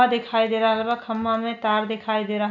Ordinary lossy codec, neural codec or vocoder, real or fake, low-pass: none; none; real; 7.2 kHz